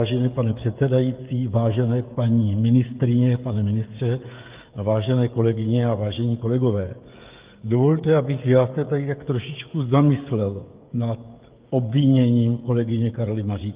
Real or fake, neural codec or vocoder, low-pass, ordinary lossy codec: fake; codec, 16 kHz, 16 kbps, FreqCodec, smaller model; 3.6 kHz; Opus, 32 kbps